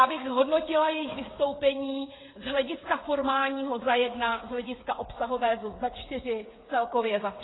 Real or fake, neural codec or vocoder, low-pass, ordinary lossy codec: fake; codec, 16 kHz, 8 kbps, FreqCodec, smaller model; 7.2 kHz; AAC, 16 kbps